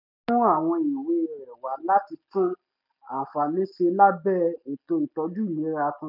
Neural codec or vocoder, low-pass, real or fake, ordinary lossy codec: none; 5.4 kHz; real; none